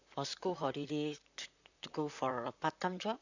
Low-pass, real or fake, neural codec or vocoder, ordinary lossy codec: 7.2 kHz; fake; vocoder, 44.1 kHz, 128 mel bands, Pupu-Vocoder; none